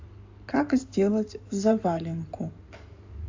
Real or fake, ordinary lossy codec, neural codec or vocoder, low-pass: fake; AAC, 48 kbps; codec, 16 kHz in and 24 kHz out, 2.2 kbps, FireRedTTS-2 codec; 7.2 kHz